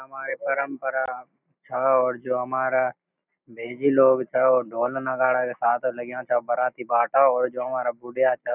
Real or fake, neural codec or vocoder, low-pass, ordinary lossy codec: real; none; 3.6 kHz; none